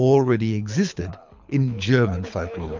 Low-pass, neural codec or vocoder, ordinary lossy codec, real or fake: 7.2 kHz; codec, 24 kHz, 6 kbps, HILCodec; MP3, 64 kbps; fake